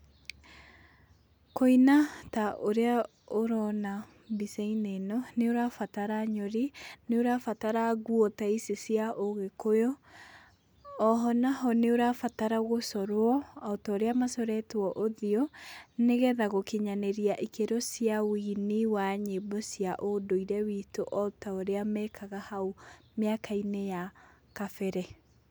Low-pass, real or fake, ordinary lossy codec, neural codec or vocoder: none; real; none; none